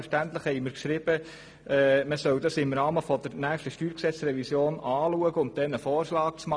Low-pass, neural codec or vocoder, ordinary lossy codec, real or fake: none; none; none; real